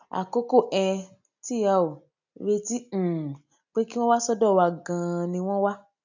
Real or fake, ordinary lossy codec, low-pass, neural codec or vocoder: real; none; 7.2 kHz; none